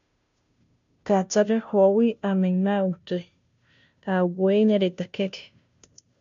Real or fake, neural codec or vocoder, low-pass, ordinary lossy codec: fake; codec, 16 kHz, 0.5 kbps, FunCodec, trained on Chinese and English, 25 frames a second; 7.2 kHz; MP3, 64 kbps